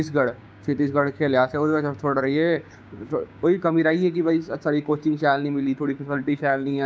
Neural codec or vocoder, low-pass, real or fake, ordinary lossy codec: codec, 16 kHz, 6 kbps, DAC; none; fake; none